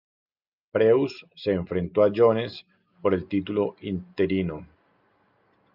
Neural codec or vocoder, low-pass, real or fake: none; 5.4 kHz; real